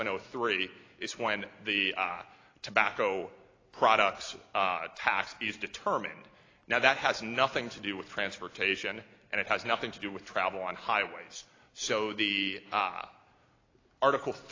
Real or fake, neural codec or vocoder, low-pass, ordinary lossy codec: real; none; 7.2 kHz; AAC, 32 kbps